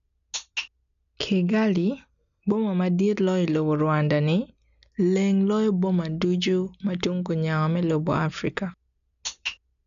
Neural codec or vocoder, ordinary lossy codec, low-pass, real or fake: none; none; 7.2 kHz; real